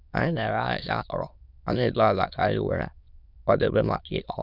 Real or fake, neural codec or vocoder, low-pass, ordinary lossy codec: fake; autoencoder, 22.05 kHz, a latent of 192 numbers a frame, VITS, trained on many speakers; 5.4 kHz; none